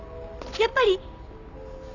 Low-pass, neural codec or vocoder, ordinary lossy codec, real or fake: 7.2 kHz; none; none; real